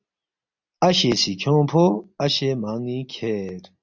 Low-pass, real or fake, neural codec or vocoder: 7.2 kHz; real; none